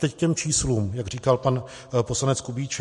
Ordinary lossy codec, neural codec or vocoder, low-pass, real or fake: MP3, 48 kbps; none; 14.4 kHz; real